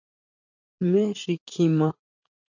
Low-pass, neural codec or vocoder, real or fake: 7.2 kHz; vocoder, 44.1 kHz, 80 mel bands, Vocos; fake